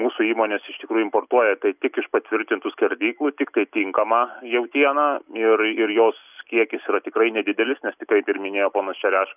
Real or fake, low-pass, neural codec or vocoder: real; 3.6 kHz; none